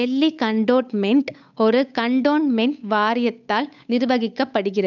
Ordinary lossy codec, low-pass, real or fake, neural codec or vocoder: none; 7.2 kHz; fake; codec, 16 kHz, 8 kbps, FunCodec, trained on Chinese and English, 25 frames a second